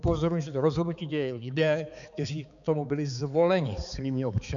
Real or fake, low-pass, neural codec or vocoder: fake; 7.2 kHz; codec, 16 kHz, 4 kbps, X-Codec, HuBERT features, trained on balanced general audio